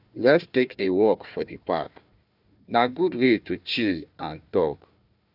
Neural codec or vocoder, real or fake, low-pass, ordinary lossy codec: codec, 16 kHz, 1 kbps, FunCodec, trained on Chinese and English, 50 frames a second; fake; 5.4 kHz; none